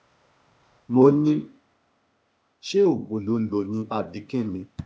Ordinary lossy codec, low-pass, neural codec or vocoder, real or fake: none; none; codec, 16 kHz, 0.8 kbps, ZipCodec; fake